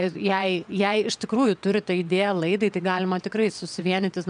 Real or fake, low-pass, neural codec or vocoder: fake; 9.9 kHz; vocoder, 22.05 kHz, 80 mel bands, WaveNeXt